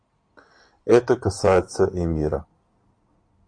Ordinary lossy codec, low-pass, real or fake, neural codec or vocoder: MP3, 48 kbps; 9.9 kHz; fake; codec, 16 kHz in and 24 kHz out, 2.2 kbps, FireRedTTS-2 codec